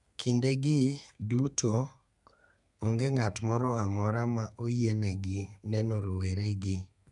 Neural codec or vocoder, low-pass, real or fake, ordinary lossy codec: codec, 32 kHz, 1.9 kbps, SNAC; 10.8 kHz; fake; none